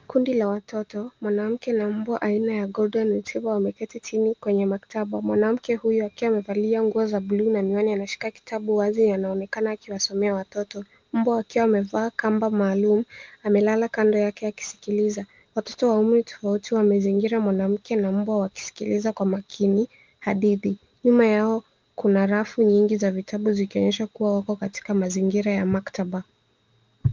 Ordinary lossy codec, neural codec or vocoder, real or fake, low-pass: Opus, 32 kbps; none; real; 7.2 kHz